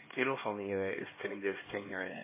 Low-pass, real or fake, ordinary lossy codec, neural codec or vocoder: 3.6 kHz; fake; MP3, 16 kbps; codec, 16 kHz, 2 kbps, X-Codec, HuBERT features, trained on LibriSpeech